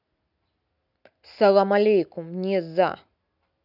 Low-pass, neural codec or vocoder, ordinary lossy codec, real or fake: 5.4 kHz; none; none; real